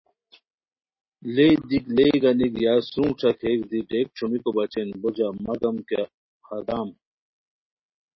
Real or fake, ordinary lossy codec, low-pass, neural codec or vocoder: real; MP3, 24 kbps; 7.2 kHz; none